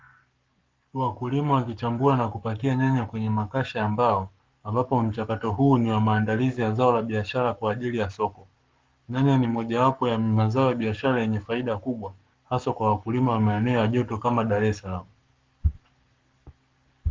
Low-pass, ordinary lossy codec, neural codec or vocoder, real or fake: 7.2 kHz; Opus, 32 kbps; codec, 44.1 kHz, 7.8 kbps, Pupu-Codec; fake